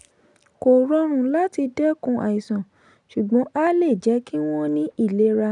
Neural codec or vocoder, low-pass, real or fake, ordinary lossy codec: none; 10.8 kHz; real; none